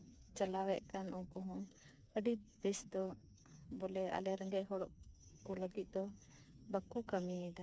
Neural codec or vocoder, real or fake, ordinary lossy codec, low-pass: codec, 16 kHz, 4 kbps, FreqCodec, smaller model; fake; none; none